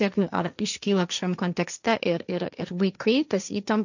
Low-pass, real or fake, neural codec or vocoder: 7.2 kHz; fake; codec, 16 kHz, 1.1 kbps, Voila-Tokenizer